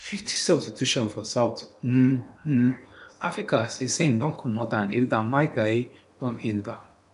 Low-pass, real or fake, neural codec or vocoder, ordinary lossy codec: 10.8 kHz; fake; codec, 16 kHz in and 24 kHz out, 0.8 kbps, FocalCodec, streaming, 65536 codes; none